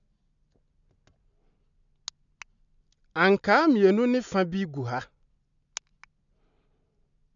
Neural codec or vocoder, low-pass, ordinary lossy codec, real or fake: none; 7.2 kHz; none; real